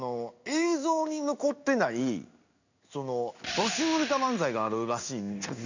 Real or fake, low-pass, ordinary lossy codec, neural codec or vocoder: fake; 7.2 kHz; none; codec, 16 kHz in and 24 kHz out, 1 kbps, XY-Tokenizer